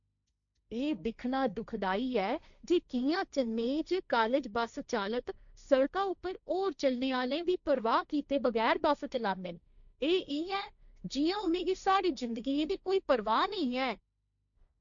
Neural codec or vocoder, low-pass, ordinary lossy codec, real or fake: codec, 16 kHz, 1.1 kbps, Voila-Tokenizer; 7.2 kHz; none; fake